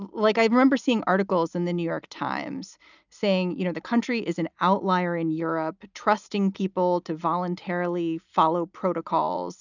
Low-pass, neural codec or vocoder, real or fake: 7.2 kHz; none; real